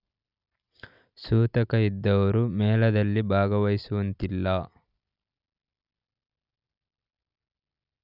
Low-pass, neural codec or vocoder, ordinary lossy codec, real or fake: 5.4 kHz; none; none; real